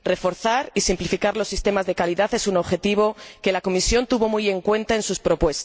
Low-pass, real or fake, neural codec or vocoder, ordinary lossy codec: none; real; none; none